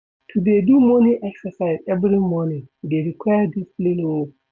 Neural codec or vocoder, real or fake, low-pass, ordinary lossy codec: none; real; none; none